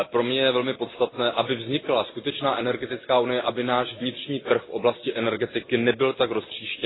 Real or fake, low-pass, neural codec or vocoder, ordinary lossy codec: real; 7.2 kHz; none; AAC, 16 kbps